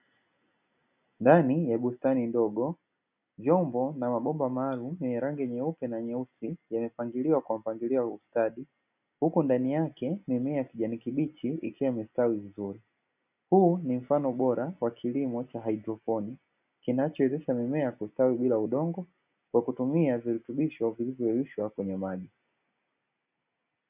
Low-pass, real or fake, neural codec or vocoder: 3.6 kHz; real; none